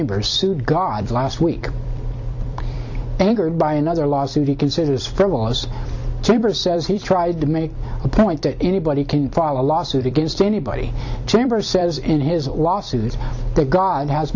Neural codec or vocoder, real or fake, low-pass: vocoder, 44.1 kHz, 128 mel bands every 256 samples, BigVGAN v2; fake; 7.2 kHz